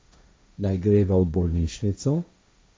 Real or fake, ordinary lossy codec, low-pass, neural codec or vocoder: fake; none; none; codec, 16 kHz, 1.1 kbps, Voila-Tokenizer